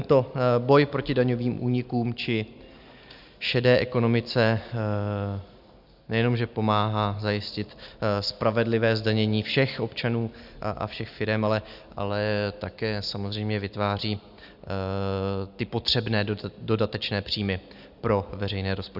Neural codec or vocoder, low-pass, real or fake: none; 5.4 kHz; real